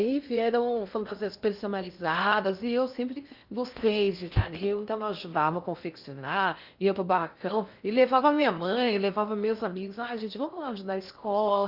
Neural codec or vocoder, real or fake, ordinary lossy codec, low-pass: codec, 16 kHz in and 24 kHz out, 0.8 kbps, FocalCodec, streaming, 65536 codes; fake; Opus, 64 kbps; 5.4 kHz